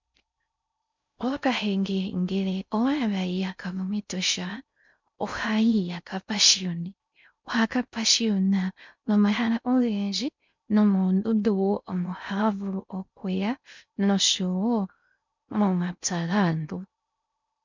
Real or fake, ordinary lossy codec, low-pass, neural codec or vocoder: fake; MP3, 64 kbps; 7.2 kHz; codec, 16 kHz in and 24 kHz out, 0.6 kbps, FocalCodec, streaming, 4096 codes